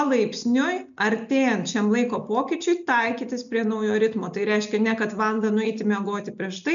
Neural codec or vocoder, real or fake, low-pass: none; real; 7.2 kHz